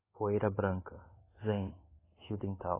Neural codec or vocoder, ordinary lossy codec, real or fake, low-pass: codec, 24 kHz, 1.2 kbps, DualCodec; AAC, 16 kbps; fake; 3.6 kHz